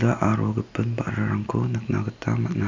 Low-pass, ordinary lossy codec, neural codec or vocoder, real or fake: 7.2 kHz; MP3, 64 kbps; none; real